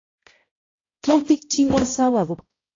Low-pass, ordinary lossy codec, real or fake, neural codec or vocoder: 7.2 kHz; AAC, 32 kbps; fake; codec, 16 kHz, 0.5 kbps, X-Codec, HuBERT features, trained on balanced general audio